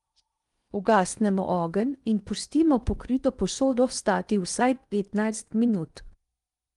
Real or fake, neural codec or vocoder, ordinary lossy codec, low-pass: fake; codec, 16 kHz in and 24 kHz out, 0.8 kbps, FocalCodec, streaming, 65536 codes; Opus, 32 kbps; 10.8 kHz